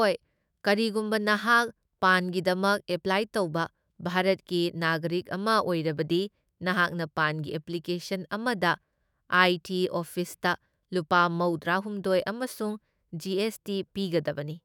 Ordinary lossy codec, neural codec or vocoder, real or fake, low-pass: none; autoencoder, 48 kHz, 128 numbers a frame, DAC-VAE, trained on Japanese speech; fake; none